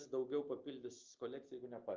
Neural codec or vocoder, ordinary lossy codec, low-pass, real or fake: none; Opus, 32 kbps; 7.2 kHz; real